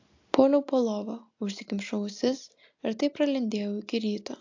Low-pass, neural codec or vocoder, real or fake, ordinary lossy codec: 7.2 kHz; none; real; AAC, 48 kbps